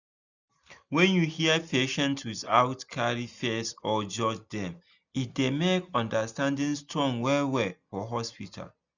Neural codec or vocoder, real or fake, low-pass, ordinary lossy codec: none; real; 7.2 kHz; none